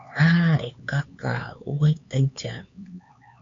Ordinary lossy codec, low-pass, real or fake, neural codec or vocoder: AAC, 48 kbps; 7.2 kHz; fake; codec, 16 kHz, 4 kbps, X-Codec, HuBERT features, trained on LibriSpeech